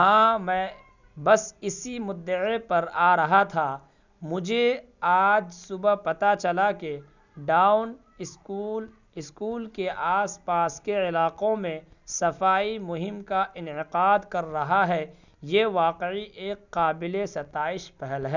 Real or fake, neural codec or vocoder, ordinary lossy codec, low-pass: real; none; none; 7.2 kHz